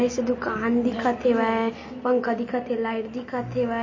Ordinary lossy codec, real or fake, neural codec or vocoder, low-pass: MP3, 32 kbps; real; none; 7.2 kHz